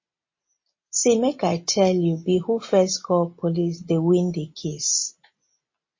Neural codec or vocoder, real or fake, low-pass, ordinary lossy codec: none; real; 7.2 kHz; MP3, 32 kbps